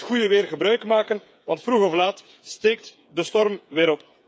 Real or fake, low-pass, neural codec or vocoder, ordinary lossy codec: fake; none; codec, 16 kHz, 8 kbps, FreqCodec, smaller model; none